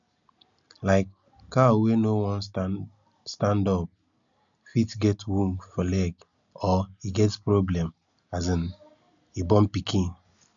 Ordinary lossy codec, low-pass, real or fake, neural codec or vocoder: none; 7.2 kHz; real; none